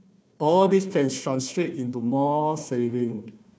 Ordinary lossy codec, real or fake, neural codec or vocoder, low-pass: none; fake; codec, 16 kHz, 1 kbps, FunCodec, trained on Chinese and English, 50 frames a second; none